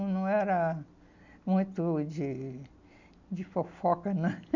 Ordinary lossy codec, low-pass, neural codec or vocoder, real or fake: none; 7.2 kHz; none; real